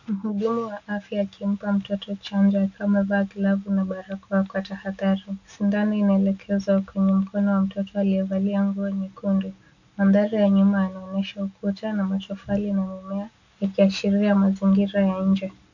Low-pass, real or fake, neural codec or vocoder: 7.2 kHz; real; none